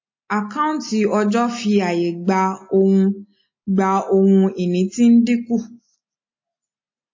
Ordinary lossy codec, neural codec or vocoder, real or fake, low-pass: MP3, 32 kbps; none; real; 7.2 kHz